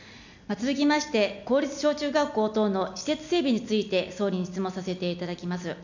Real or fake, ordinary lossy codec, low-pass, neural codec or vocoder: real; none; 7.2 kHz; none